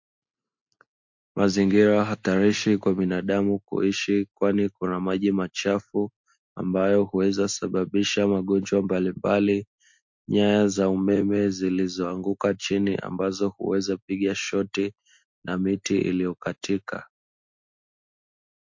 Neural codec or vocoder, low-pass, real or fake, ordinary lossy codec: none; 7.2 kHz; real; MP3, 48 kbps